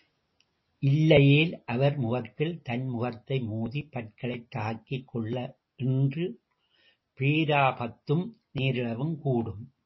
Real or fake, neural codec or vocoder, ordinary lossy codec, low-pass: real; none; MP3, 24 kbps; 7.2 kHz